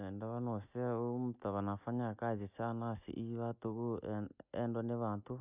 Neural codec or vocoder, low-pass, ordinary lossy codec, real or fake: none; 3.6 kHz; none; real